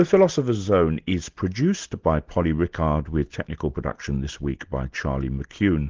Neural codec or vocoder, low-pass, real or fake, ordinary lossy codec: none; 7.2 kHz; real; Opus, 16 kbps